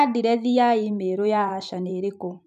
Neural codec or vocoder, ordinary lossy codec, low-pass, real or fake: vocoder, 44.1 kHz, 128 mel bands every 512 samples, BigVGAN v2; none; 14.4 kHz; fake